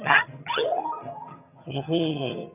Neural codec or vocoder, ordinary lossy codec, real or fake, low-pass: vocoder, 22.05 kHz, 80 mel bands, HiFi-GAN; none; fake; 3.6 kHz